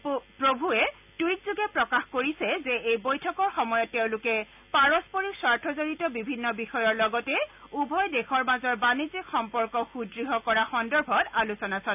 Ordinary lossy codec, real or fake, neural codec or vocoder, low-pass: none; real; none; 3.6 kHz